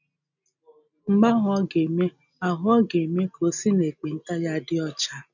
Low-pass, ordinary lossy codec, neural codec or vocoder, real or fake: 7.2 kHz; none; none; real